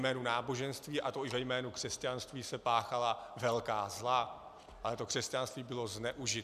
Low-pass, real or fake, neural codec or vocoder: 14.4 kHz; real; none